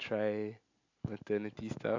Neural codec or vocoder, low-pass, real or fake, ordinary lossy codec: none; 7.2 kHz; real; AAC, 48 kbps